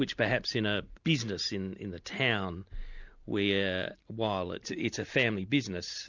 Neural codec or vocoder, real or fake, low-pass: none; real; 7.2 kHz